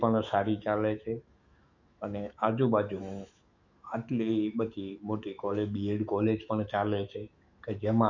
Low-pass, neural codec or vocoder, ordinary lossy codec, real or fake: 7.2 kHz; autoencoder, 48 kHz, 128 numbers a frame, DAC-VAE, trained on Japanese speech; Opus, 64 kbps; fake